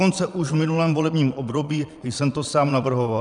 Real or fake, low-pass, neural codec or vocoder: fake; 9.9 kHz; vocoder, 22.05 kHz, 80 mel bands, Vocos